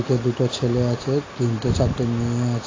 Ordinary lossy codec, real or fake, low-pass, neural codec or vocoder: AAC, 32 kbps; real; 7.2 kHz; none